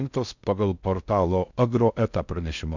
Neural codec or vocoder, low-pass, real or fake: codec, 16 kHz in and 24 kHz out, 0.6 kbps, FocalCodec, streaming, 4096 codes; 7.2 kHz; fake